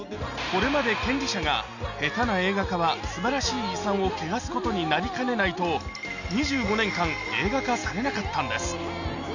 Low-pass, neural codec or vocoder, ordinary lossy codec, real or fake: 7.2 kHz; none; none; real